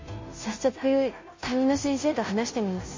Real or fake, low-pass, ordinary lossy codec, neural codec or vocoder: fake; 7.2 kHz; MP3, 32 kbps; codec, 16 kHz, 0.5 kbps, FunCodec, trained on Chinese and English, 25 frames a second